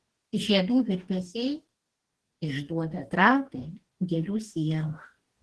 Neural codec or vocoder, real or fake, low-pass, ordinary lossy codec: codec, 44.1 kHz, 2.6 kbps, DAC; fake; 10.8 kHz; Opus, 16 kbps